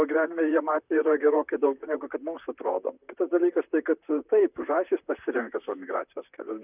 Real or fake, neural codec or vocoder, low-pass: fake; vocoder, 44.1 kHz, 128 mel bands, Pupu-Vocoder; 3.6 kHz